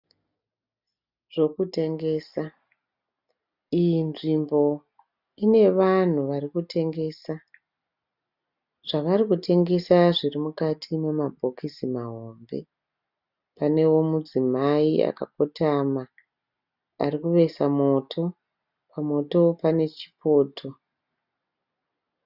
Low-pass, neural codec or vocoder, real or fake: 5.4 kHz; none; real